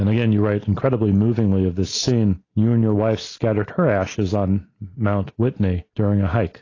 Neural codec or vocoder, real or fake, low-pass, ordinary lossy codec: none; real; 7.2 kHz; AAC, 32 kbps